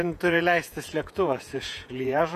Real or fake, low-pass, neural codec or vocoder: fake; 14.4 kHz; vocoder, 48 kHz, 128 mel bands, Vocos